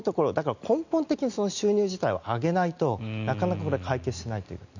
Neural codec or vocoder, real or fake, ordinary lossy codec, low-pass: none; real; none; 7.2 kHz